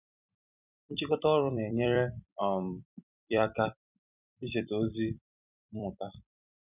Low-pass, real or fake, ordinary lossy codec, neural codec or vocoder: 3.6 kHz; fake; none; vocoder, 44.1 kHz, 128 mel bands every 256 samples, BigVGAN v2